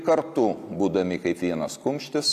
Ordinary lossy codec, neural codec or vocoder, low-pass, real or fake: AAC, 96 kbps; none; 14.4 kHz; real